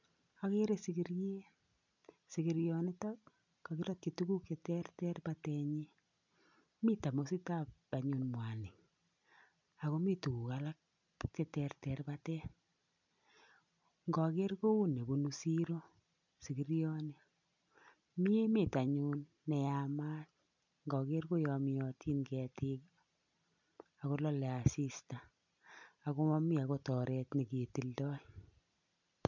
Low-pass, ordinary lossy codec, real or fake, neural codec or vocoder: 7.2 kHz; none; real; none